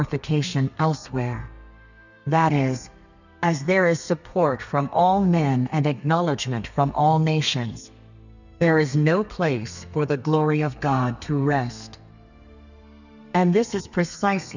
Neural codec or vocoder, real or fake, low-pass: codec, 32 kHz, 1.9 kbps, SNAC; fake; 7.2 kHz